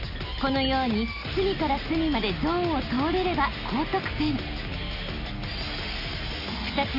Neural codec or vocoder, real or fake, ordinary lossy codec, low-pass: none; real; AAC, 32 kbps; 5.4 kHz